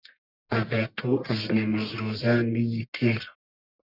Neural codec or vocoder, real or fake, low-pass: codec, 44.1 kHz, 1.7 kbps, Pupu-Codec; fake; 5.4 kHz